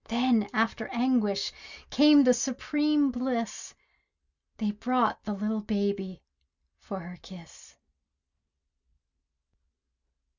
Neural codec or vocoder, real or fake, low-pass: none; real; 7.2 kHz